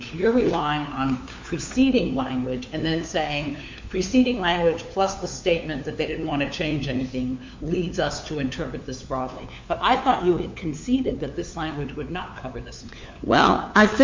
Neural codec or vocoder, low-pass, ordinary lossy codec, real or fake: codec, 16 kHz, 4 kbps, FunCodec, trained on LibriTTS, 50 frames a second; 7.2 kHz; MP3, 48 kbps; fake